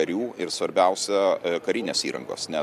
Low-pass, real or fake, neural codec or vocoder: 14.4 kHz; real; none